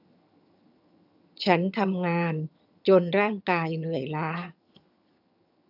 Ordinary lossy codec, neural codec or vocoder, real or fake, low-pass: AAC, 48 kbps; vocoder, 22.05 kHz, 80 mel bands, HiFi-GAN; fake; 5.4 kHz